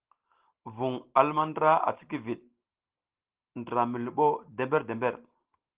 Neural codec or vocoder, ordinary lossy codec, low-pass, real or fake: none; Opus, 16 kbps; 3.6 kHz; real